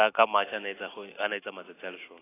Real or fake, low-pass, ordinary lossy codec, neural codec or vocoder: real; 3.6 kHz; AAC, 16 kbps; none